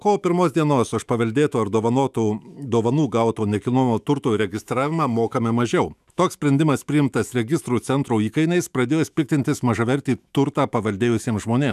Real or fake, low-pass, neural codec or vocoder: fake; 14.4 kHz; autoencoder, 48 kHz, 128 numbers a frame, DAC-VAE, trained on Japanese speech